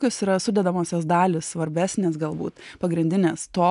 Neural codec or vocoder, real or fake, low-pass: none; real; 10.8 kHz